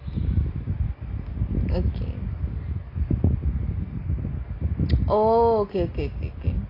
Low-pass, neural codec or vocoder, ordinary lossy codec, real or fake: 5.4 kHz; none; AAC, 24 kbps; real